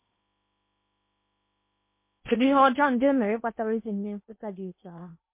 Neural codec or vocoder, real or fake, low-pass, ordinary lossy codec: codec, 16 kHz in and 24 kHz out, 0.8 kbps, FocalCodec, streaming, 65536 codes; fake; 3.6 kHz; MP3, 24 kbps